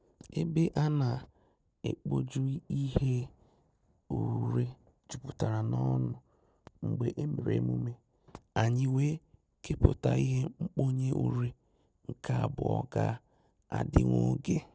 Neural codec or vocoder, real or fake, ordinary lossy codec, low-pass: none; real; none; none